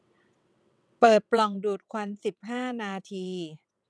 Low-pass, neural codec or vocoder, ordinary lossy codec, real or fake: none; vocoder, 22.05 kHz, 80 mel bands, WaveNeXt; none; fake